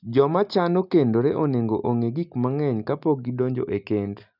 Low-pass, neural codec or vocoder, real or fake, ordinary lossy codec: 5.4 kHz; none; real; none